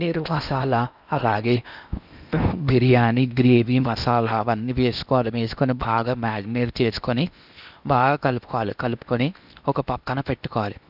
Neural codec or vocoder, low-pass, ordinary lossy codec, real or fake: codec, 16 kHz in and 24 kHz out, 0.8 kbps, FocalCodec, streaming, 65536 codes; 5.4 kHz; none; fake